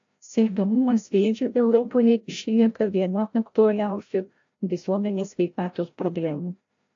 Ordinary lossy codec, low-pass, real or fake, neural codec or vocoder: AAC, 48 kbps; 7.2 kHz; fake; codec, 16 kHz, 0.5 kbps, FreqCodec, larger model